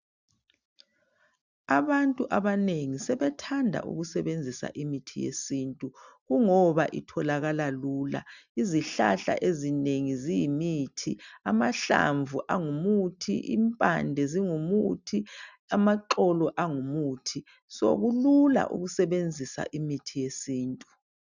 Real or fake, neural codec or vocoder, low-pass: real; none; 7.2 kHz